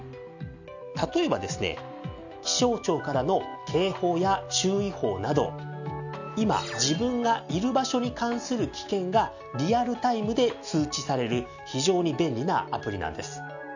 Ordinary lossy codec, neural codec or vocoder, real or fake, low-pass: none; none; real; 7.2 kHz